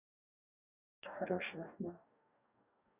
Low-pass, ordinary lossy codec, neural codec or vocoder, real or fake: 3.6 kHz; none; codec, 44.1 kHz, 2.6 kbps, DAC; fake